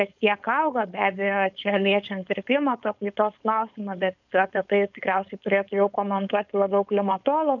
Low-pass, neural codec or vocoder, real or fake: 7.2 kHz; codec, 16 kHz, 4.8 kbps, FACodec; fake